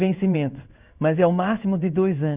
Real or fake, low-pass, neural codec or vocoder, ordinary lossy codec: fake; 3.6 kHz; codec, 16 kHz in and 24 kHz out, 1 kbps, XY-Tokenizer; Opus, 64 kbps